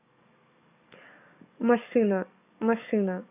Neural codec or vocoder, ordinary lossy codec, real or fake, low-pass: codec, 44.1 kHz, 7.8 kbps, DAC; none; fake; 3.6 kHz